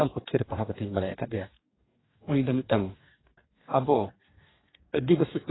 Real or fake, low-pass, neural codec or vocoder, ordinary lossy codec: fake; 7.2 kHz; codec, 44.1 kHz, 2.6 kbps, DAC; AAC, 16 kbps